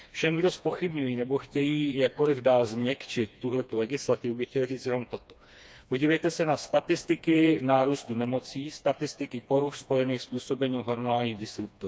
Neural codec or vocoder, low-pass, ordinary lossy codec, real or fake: codec, 16 kHz, 2 kbps, FreqCodec, smaller model; none; none; fake